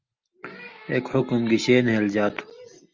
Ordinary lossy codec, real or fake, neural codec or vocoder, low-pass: Opus, 32 kbps; real; none; 7.2 kHz